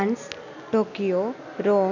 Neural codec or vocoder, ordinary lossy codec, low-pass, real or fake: none; none; 7.2 kHz; real